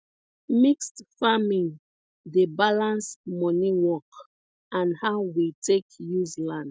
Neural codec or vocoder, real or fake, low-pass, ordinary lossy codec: none; real; none; none